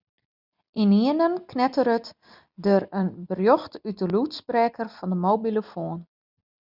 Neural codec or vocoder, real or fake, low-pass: none; real; 5.4 kHz